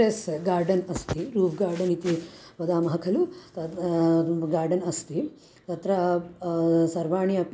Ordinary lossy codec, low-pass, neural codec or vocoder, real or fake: none; none; none; real